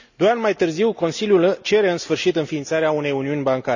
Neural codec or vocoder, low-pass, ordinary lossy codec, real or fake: none; 7.2 kHz; none; real